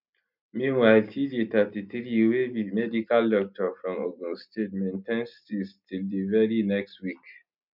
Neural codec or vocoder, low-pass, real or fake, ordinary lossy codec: none; 5.4 kHz; real; none